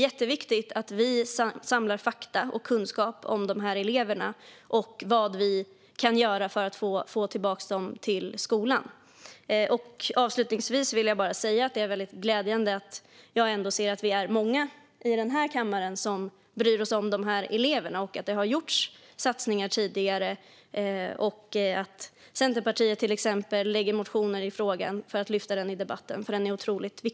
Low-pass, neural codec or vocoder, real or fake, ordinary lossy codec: none; none; real; none